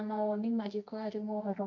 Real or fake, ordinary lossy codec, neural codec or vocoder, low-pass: fake; none; codec, 24 kHz, 0.9 kbps, WavTokenizer, medium music audio release; 7.2 kHz